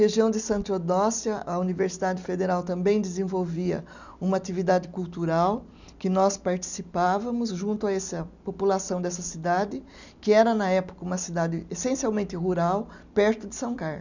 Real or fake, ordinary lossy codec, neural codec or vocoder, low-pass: real; none; none; 7.2 kHz